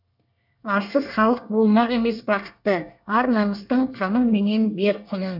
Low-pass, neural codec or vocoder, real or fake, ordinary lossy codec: 5.4 kHz; codec, 24 kHz, 1 kbps, SNAC; fake; none